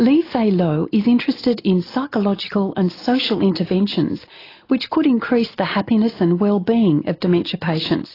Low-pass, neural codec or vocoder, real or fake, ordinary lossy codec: 5.4 kHz; none; real; AAC, 24 kbps